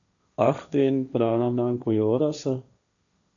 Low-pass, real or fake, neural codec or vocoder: 7.2 kHz; fake; codec, 16 kHz, 1.1 kbps, Voila-Tokenizer